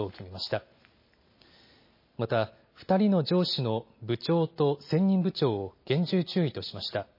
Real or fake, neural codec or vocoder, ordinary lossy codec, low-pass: real; none; MP3, 24 kbps; 5.4 kHz